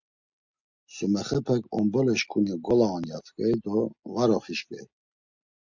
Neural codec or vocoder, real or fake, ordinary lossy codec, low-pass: none; real; Opus, 64 kbps; 7.2 kHz